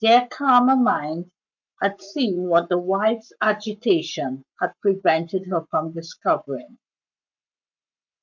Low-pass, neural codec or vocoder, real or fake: 7.2 kHz; codec, 44.1 kHz, 7.8 kbps, Pupu-Codec; fake